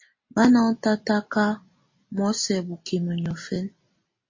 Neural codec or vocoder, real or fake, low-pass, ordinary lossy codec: none; real; 7.2 kHz; MP3, 32 kbps